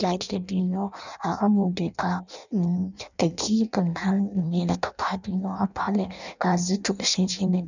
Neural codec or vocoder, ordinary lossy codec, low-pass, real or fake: codec, 16 kHz in and 24 kHz out, 0.6 kbps, FireRedTTS-2 codec; none; 7.2 kHz; fake